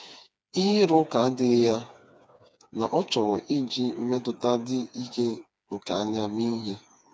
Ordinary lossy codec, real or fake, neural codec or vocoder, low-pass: none; fake; codec, 16 kHz, 4 kbps, FreqCodec, smaller model; none